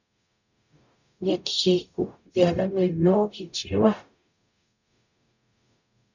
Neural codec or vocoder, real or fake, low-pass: codec, 44.1 kHz, 0.9 kbps, DAC; fake; 7.2 kHz